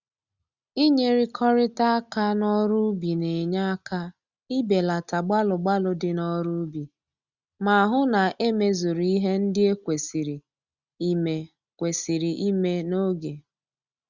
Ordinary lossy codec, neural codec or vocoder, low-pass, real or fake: Opus, 64 kbps; none; 7.2 kHz; real